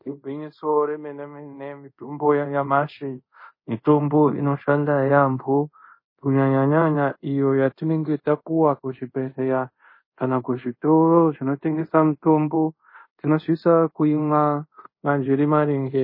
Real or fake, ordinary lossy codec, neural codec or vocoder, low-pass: fake; MP3, 24 kbps; codec, 24 kHz, 0.5 kbps, DualCodec; 5.4 kHz